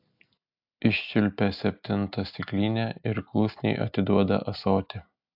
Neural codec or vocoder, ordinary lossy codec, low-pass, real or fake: none; AAC, 48 kbps; 5.4 kHz; real